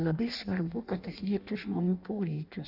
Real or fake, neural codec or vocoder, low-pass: fake; codec, 16 kHz in and 24 kHz out, 0.6 kbps, FireRedTTS-2 codec; 5.4 kHz